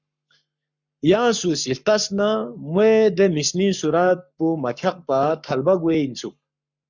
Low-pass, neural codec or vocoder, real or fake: 7.2 kHz; codec, 44.1 kHz, 7.8 kbps, Pupu-Codec; fake